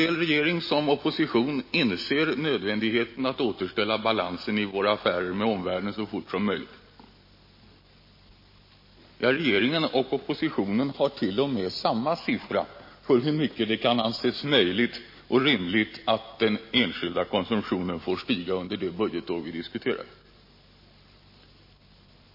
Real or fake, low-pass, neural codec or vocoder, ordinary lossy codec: real; 5.4 kHz; none; MP3, 24 kbps